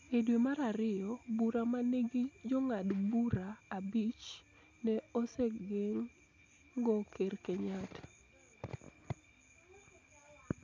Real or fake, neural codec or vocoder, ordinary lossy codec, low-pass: real; none; none; none